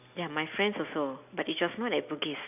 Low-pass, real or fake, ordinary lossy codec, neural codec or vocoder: 3.6 kHz; real; none; none